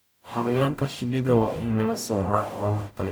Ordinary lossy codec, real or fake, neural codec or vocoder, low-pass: none; fake; codec, 44.1 kHz, 0.9 kbps, DAC; none